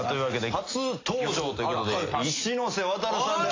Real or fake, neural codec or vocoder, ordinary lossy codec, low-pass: real; none; AAC, 32 kbps; 7.2 kHz